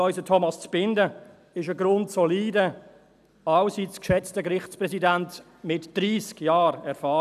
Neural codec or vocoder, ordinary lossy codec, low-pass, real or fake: none; none; 14.4 kHz; real